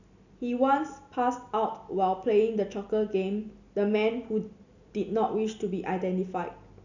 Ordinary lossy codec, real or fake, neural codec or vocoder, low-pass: none; real; none; 7.2 kHz